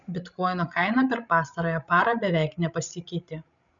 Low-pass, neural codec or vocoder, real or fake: 7.2 kHz; codec, 16 kHz, 16 kbps, FreqCodec, larger model; fake